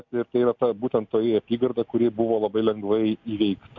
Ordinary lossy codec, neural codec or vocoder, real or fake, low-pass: MP3, 64 kbps; none; real; 7.2 kHz